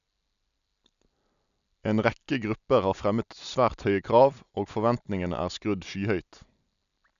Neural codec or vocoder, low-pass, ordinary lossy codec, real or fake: none; 7.2 kHz; none; real